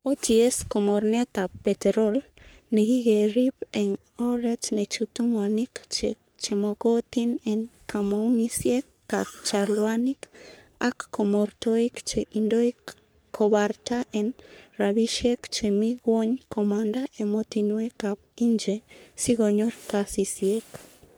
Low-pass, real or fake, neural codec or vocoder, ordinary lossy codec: none; fake; codec, 44.1 kHz, 3.4 kbps, Pupu-Codec; none